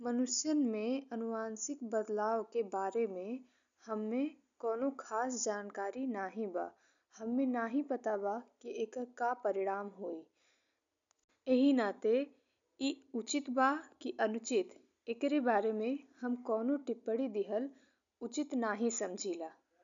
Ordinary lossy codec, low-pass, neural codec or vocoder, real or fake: none; 7.2 kHz; none; real